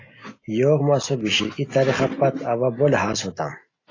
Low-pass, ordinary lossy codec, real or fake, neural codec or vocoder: 7.2 kHz; AAC, 32 kbps; real; none